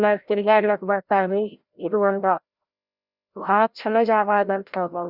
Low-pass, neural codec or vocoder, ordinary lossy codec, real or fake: 5.4 kHz; codec, 16 kHz, 0.5 kbps, FreqCodec, larger model; Opus, 64 kbps; fake